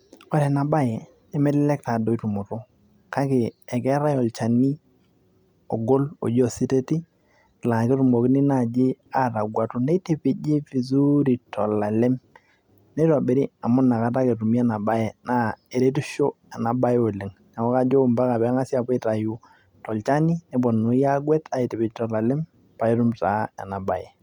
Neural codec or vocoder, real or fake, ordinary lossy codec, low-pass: none; real; none; 19.8 kHz